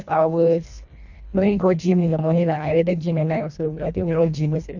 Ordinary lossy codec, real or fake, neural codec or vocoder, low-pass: none; fake; codec, 24 kHz, 1.5 kbps, HILCodec; 7.2 kHz